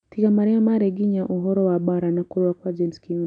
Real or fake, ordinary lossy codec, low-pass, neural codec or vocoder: real; MP3, 96 kbps; 9.9 kHz; none